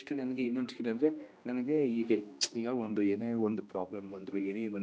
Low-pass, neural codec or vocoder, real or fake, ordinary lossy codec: none; codec, 16 kHz, 1 kbps, X-Codec, HuBERT features, trained on general audio; fake; none